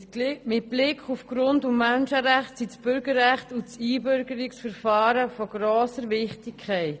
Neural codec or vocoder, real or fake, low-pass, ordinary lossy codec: none; real; none; none